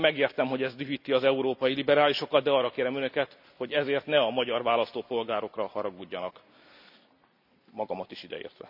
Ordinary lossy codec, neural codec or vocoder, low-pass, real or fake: none; none; 5.4 kHz; real